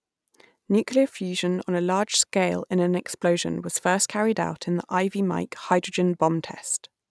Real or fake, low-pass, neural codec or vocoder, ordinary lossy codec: real; none; none; none